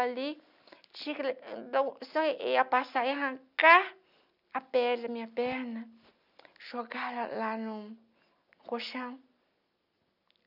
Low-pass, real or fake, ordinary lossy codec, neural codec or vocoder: 5.4 kHz; real; none; none